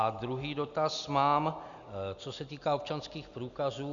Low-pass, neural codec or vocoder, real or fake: 7.2 kHz; none; real